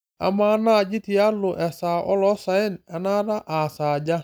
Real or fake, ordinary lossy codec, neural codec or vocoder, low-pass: real; none; none; none